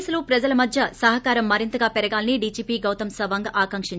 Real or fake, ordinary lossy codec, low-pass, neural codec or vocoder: real; none; none; none